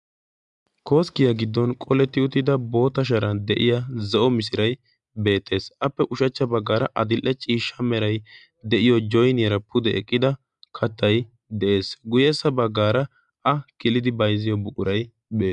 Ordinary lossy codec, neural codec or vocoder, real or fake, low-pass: MP3, 96 kbps; none; real; 10.8 kHz